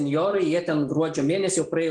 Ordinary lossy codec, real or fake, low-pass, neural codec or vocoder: Opus, 24 kbps; real; 10.8 kHz; none